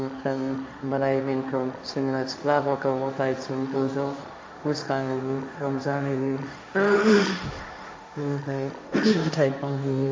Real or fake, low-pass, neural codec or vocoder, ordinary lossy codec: fake; none; codec, 16 kHz, 1.1 kbps, Voila-Tokenizer; none